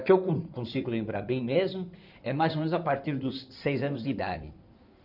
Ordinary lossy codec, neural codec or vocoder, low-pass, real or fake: none; codec, 16 kHz in and 24 kHz out, 2.2 kbps, FireRedTTS-2 codec; 5.4 kHz; fake